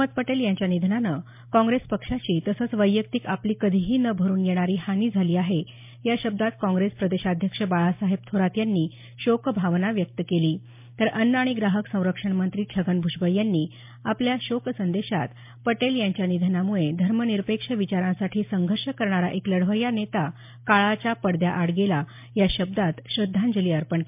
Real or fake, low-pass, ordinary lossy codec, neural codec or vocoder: real; 3.6 kHz; MP3, 32 kbps; none